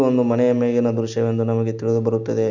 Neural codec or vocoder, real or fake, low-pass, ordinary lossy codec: none; real; 7.2 kHz; none